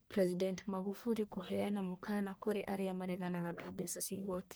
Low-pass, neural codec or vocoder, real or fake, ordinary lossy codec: none; codec, 44.1 kHz, 1.7 kbps, Pupu-Codec; fake; none